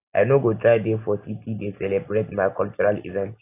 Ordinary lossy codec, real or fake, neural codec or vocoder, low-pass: AAC, 24 kbps; real; none; 3.6 kHz